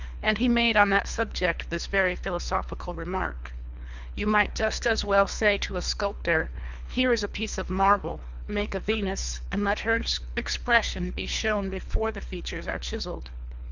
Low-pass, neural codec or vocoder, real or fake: 7.2 kHz; codec, 24 kHz, 3 kbps, HILCodec; fake